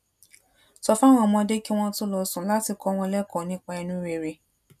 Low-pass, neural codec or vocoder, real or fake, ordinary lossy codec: 14.4 kHz; none; real; none